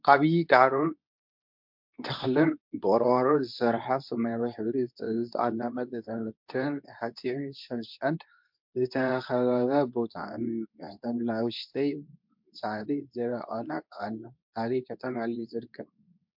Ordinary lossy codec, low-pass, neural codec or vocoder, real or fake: MP3, 48 kbps; 5.4 kHz; codec, 24 kHz, 0.9 kbps, WavTokenizer, medium speech release version 2; fake